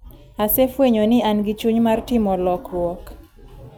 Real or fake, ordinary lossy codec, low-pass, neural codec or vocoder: real; none; none; none